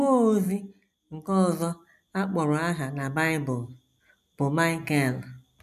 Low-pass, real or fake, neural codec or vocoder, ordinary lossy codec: 14.4 kHz; real; none; none